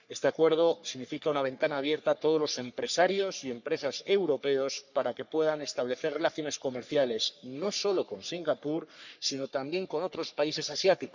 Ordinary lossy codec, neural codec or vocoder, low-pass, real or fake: none; codec, 44.1 kHz, 3.4 kbps, Pupu-Codec; 7.2 kHz; fake